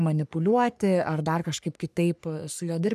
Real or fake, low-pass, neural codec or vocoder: fake; 14.4 kHz; codec, 44.1 kHz, 3.4 kbps, Pupu-Codec